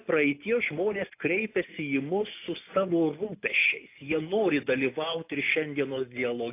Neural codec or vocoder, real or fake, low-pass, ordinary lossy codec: none; real; 3.6 kHz; AAC, 24 kbps